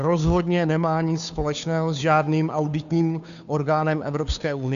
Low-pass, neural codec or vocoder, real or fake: 7.2 kHz; codec, 16 kHz, 2 kbps, FunCodec, trained on Chinese and English, 25 frames a second; fake